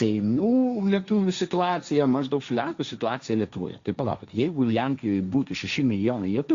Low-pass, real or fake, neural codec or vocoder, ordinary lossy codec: 7.2 kHz; fake; codec, 16 kHz, 1.1 kbps, Voila-Tokenizer; Opus, 64 kbps